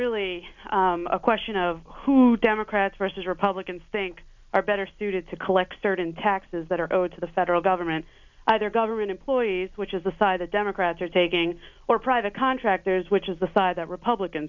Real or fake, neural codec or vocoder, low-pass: real; none; 7.2 kHz